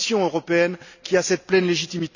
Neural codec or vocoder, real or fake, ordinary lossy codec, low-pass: none; real; none; 7.2 kHz